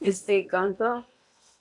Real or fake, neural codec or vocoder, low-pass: fake; codec, 16 kHz in and 24 kHz out, 0.8 kbps, FocalCodec, streaming, 65536 codes; 10.8 kHz